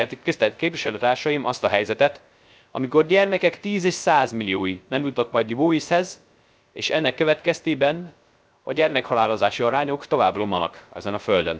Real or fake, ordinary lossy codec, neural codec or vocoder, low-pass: fake; none; codec, 16 kHz, 0.3 kbps, FocalCodec; none